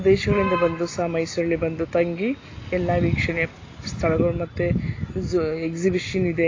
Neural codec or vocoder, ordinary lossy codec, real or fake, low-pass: none; AAC, 32 kbps; real; 7.2 kHz